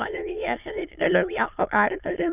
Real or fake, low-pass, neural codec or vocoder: fake; 3.6 kHz; autoencoder, 22.05 kHz, a latent of 192 numbers a frame, VITS, trained on many speakers